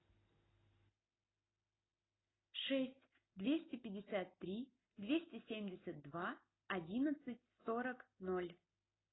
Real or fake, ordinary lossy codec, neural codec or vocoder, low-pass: real; AAC, 16 kbps; none; 7.2 kHz